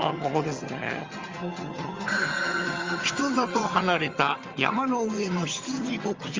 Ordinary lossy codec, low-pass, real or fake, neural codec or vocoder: Opus, 32 kbps; 7.2 kHz; fake; vocoder, 22.05 kHz, 80 mel bands, HiFi-GAN